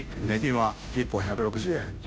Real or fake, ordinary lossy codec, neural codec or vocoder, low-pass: fake; none; codec, 16 kHz, 0.5 kbps, FunCodec, trained on Chinese and English, 25 frames a second; none